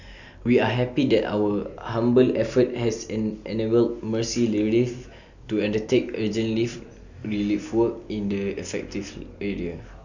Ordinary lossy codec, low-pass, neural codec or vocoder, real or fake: none; 7.2 kHz; none; real